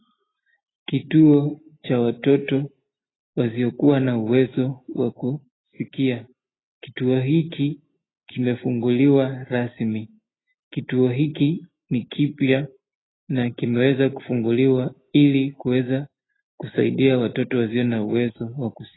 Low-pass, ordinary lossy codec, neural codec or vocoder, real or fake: 7.2 kHz; AAC, 16 kbps; none; real